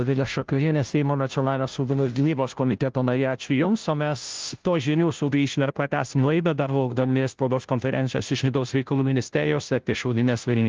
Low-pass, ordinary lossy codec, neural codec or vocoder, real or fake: 7.2 kHz; Opus, 32 kbps; codec, 16 kHz, 0.5 kbps, FunCodec, trained on Chinese and English, 25 frames a second; fake